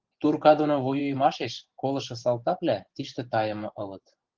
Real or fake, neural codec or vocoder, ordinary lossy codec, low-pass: fake; vocoder, 24 kHz, 100 mel bands, Vocos; Opus, 16 kbps; 7.2 kHz